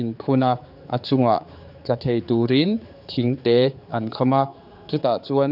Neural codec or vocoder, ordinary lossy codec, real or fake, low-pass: codec, 16 kHz, 4 kbps, X-Codec, HuBERT features, trained on general audio; none; fake; 5.4 kHz